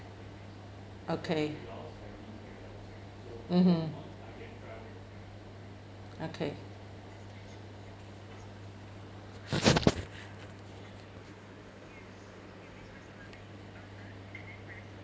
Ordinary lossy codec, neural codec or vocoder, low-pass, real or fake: none; none; none; real